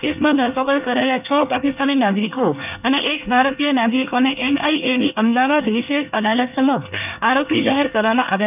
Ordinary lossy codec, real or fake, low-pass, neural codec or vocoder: none; fake; 3.6 kHz; codec, 24 kHz, 1 kbps, SNAC